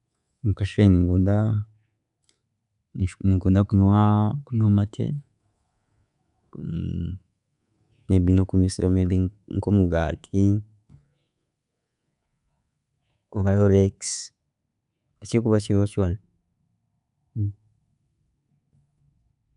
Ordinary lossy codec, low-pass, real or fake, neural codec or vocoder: none; 10.8 kHz; fake; codec, 24 kHz, 3.1 kbps, DualCodec